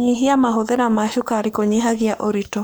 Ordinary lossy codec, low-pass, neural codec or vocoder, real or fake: none; none; codec, 44.1 kHz, 7.8 kbps, Pupu-Codec; fake